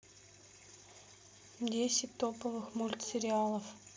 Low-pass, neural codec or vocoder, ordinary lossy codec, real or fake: none; none; none; real